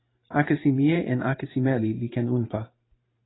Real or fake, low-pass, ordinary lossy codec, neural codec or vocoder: fake; 7.2 kHz; AAC, 16 kbps; vocoder, 44.1 kHz, 128 mel bands every 512 samples, BigVGAN v2